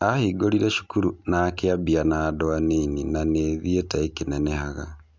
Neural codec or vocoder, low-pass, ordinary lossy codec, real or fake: none; none; none; real